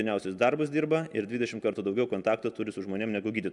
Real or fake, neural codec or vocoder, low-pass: real; none; 10.8 kHz